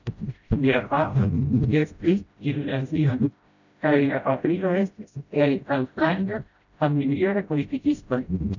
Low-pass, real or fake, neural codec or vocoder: 7.2 kHz; fake; codec, 16 kHz, 0.5 kbps, FreqCodec, smaller model